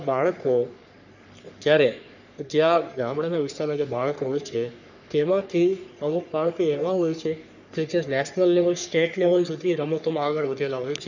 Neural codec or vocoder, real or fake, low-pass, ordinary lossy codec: codec, 44.1 kHz, 3.4 kbps, Pupu-Codec; fake; 7.2 kHz; none